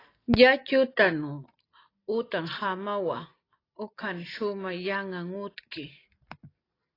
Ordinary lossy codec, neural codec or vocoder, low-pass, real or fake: AAC, 24 kbps; none; 5.4 kHz; real